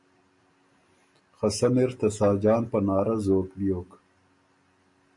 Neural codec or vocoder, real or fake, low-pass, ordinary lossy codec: none; real; 10.8 kHz; MP3, 48 kbps